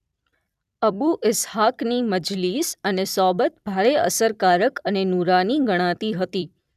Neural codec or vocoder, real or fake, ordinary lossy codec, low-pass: vocoder, 44.1 kHz, 128 mel bands every 256 samples, BigVGAN v2; fake; none; 14.4 kHz